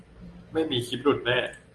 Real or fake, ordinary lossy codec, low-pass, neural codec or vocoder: real; Opus, 24 kbps; 10.8 kHz; none